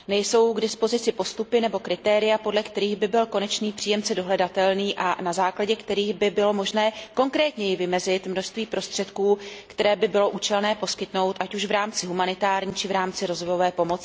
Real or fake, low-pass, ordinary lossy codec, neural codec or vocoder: real; none; none; none